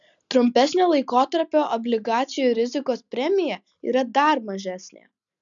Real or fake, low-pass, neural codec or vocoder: real; 7.2 kHz; none